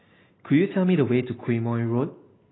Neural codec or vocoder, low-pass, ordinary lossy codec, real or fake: none; 7.2 kHz; AAC, 16 kbps; real